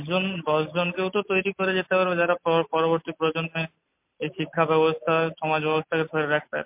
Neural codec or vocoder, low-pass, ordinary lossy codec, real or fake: none; 3.6 kHz; MP3, 32 kbps; real